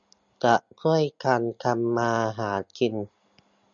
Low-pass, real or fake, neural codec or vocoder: 7.2 kHz; real; none